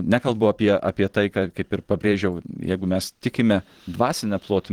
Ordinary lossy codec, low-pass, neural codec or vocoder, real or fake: Opus, 16 kbps; 19.8 kHz; vocoder, 44.1 kHz, 128 mel bands every 512 samples, BigVGAN v2; fake